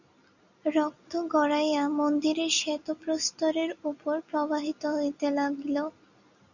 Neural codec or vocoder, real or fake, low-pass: none; real; 7.2 kHz